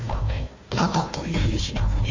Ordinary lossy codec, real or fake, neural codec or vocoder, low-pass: MP3, 48 kbps; fake; codec, 16 kHz, 1 kbps, FunCodec, trained on Chinese and English, 50 frames a second; 7.2 kHz